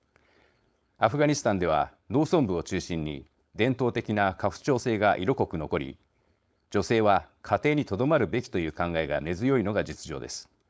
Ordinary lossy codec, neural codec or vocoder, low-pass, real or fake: none; codec, 16 kHz, 4.8 kbps, FACodec; none; fake